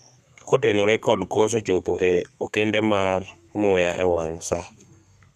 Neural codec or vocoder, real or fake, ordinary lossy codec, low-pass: codec, 32 kHz, 1.9 kbps, SNAC; fake; none; 14.4 kHz